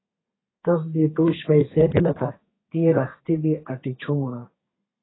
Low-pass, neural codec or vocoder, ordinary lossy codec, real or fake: 7.2 kHz; codec, 32 kHz, 1.9 kbps, SNAC; AAC, 16 kbps; fake